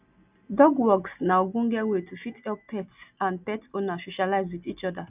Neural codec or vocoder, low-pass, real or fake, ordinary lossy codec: none; 3.6 kHz; real; none